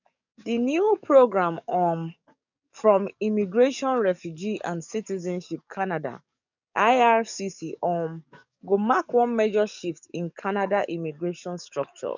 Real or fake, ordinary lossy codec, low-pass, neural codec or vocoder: fake; none; 7.2 kHz; codec, 44.1 kHz, 7.8 kbps, DAC